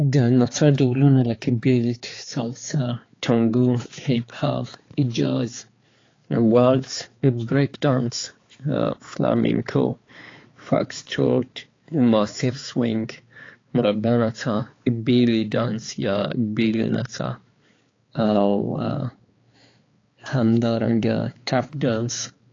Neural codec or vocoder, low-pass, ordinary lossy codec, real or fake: codec, 16 kHz, 4 kbps, X-Codec, HuBERT features, trained on balanced general audio; 7.2 kHz; AAC, 32 kbps; fake